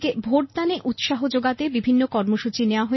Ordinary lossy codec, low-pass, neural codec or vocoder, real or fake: MP3, 24 kbps; 7.2 kHz; none; real